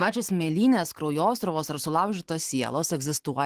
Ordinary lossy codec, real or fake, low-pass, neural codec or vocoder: Opus, 16 kbps; fake; 14.4 kHz; autoencoder, 48 kHz, 128 numbers a frame, DAC-VAE, trained on Japanese speech